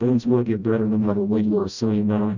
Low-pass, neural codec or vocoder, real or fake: 7.2 kHz; codec, 16 kHz, 0.5 kbps, FreqCodec, smaller model; fake